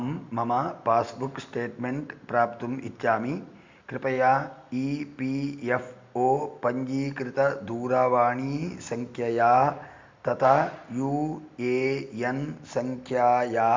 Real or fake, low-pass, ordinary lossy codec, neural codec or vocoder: real; 7.2 kHz; MP3, 64 kbps; none